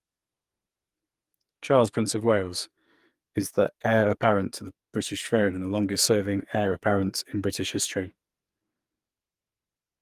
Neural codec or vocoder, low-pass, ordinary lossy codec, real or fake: codec, 44.1 kHz, 2.6 kbps, SNAC; 14.4 kHz; Opus, 32 kbps; fake